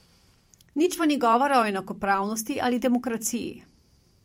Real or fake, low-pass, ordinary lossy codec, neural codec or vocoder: fake; 19.8 kHz; MP3, 64 kbps; vocoder, 44.1 kHz, 128 mel bands every 512 samples, BigVGAN v2